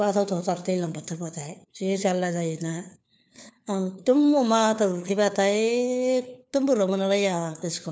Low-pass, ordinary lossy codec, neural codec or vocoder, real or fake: none; none; codec, 16 kHz, 4 kbps, FunCodec, trained on LibriTTS, 50 frames a second; fake